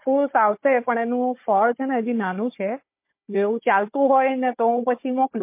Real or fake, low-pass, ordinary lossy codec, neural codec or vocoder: fake; 3.6 kHz; MP3, 24 kbps; codec, 16 kHz, 16 kbps, FunCodec, trained on Chinese and English, 50 frames a second